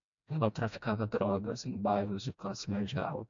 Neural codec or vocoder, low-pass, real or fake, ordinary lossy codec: codec, 16 kHz, 1 kbps, FreqCodec, smaller model; 7.2 kHz; fake; MP3, 64 kbps